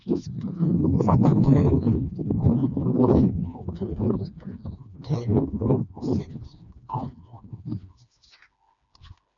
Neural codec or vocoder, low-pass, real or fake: codec, 16 kHz, 2 kbps, FreqCodec, smaller model; 7.2 kHz; fake